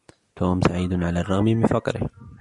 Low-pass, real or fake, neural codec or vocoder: 10.8 kHz; real; none